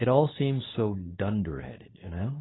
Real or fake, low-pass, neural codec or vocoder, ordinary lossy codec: fake; 7.2 kHz; codec, 24 kHz, 0.9 kbps, WavTokenizer, medium speech release version 2; AAC, 16 kbps